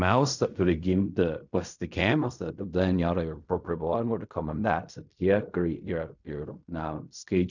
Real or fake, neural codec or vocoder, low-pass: fake; codec, 16 kHz in and 24 kHz out, 0.4 kbps, LongCat-Audio-Codec, fine tuned four codebook decoder; 7.2 kHz